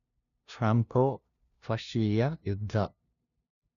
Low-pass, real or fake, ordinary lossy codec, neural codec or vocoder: 7.2 kHz; fake; none; codec, 16 kHz, 0.5 kbps, FunCodec, trained on LibriTTS, 25 frames a second